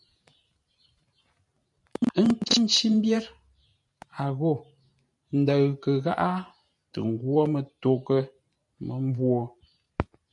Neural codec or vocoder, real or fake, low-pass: vocoder, 44.1 kHz, 128 mel bands every 512 samples, BigVGAN v2; fake; 10.8 kHz